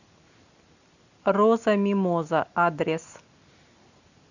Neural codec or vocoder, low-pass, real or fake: none; 7.2 kHz; real